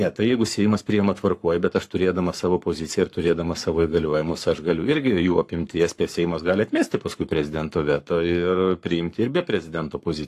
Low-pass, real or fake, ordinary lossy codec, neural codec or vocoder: 14.4 kHz; fake; AAC, 64 kbps; codec, 44.1 kHz, 7.8 kbps, Pupu-Codec